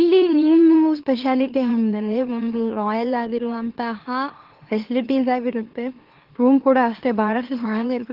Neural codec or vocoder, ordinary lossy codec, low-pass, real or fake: autoencoder, 44.1 kHz, a latent of 192 numbers a frame, MeloTTS; Opus, 16 kbps; 5.4 kHz; fake